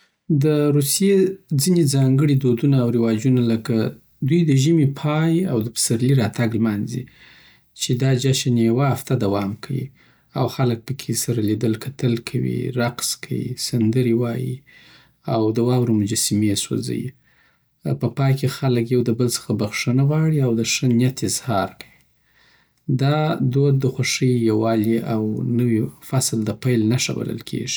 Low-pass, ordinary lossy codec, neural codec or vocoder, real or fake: none; none; none; real